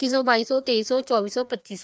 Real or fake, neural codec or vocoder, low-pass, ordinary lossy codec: fake; codec, 16 kHz, 2 kbps, FreqCodec, larger model; none; none